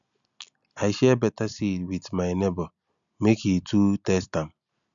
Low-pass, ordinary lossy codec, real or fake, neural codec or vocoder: 7.2 kHz; none; real; none